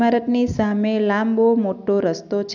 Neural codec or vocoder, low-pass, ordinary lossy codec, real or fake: none; 7.2 kHz; none; real